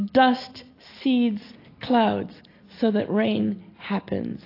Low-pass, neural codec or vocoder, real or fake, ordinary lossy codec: 5.4 kHz; none; real; AAC, 32 kbps